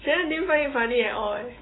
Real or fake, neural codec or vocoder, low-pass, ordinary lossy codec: fake; autoencoder, 48 kHz, 128 numbers a frame, DAC-VAE, trained on Japanese speech; 7.2 kHz; AAC, 16 kbps